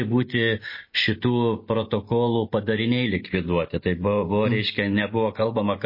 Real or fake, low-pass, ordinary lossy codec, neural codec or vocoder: real; 5.4 kHz; MP3, 24 kbps; none